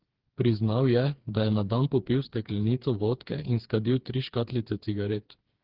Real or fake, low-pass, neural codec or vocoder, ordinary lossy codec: fake; 5.4 kHz; codec, 16 kHz, 4 kbps, FreqCodec, smaller model; Opus, 16 kbps